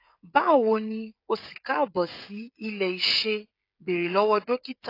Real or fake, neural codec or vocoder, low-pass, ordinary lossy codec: fake; codec, 16 kHz, 16 kbps, FreqCodec, smaller model; 5.4 kHz; AAC, 32 kbps